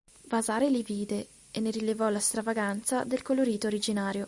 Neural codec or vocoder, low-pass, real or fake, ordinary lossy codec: none; 10.8 kHz; real; Opus, 64 kbps